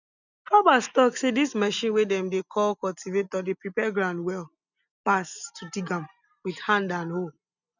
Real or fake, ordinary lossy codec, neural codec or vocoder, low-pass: real; none; none; 7.2 kHz